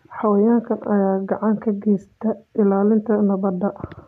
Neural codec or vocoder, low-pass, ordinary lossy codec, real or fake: none; 14.4 kHz; none; real